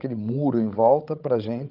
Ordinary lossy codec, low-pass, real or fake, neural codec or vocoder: Opus, 32 kbps; 5.4 kHz; fake; codec, 16 kHz, 16 kbps, FreqCodec, larger model